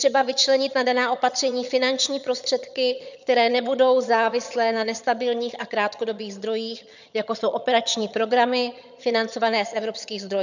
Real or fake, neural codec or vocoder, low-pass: fake; vocoder, 22.05 kHz, 80 mel bands, HiFi-GAN; 7.2 kHz